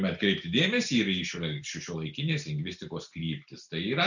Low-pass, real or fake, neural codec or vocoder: 7.2 kHz; real; none